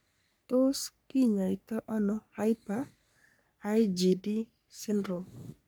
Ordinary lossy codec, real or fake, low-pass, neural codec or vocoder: none; fake; none; codec, 44.1 kHz, 3.4 kbps, Pupu-Codec